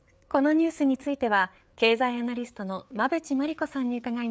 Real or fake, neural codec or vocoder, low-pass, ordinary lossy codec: fake; codec, 16 kHz, 4 kbps, FreqCodec, larger model; none; none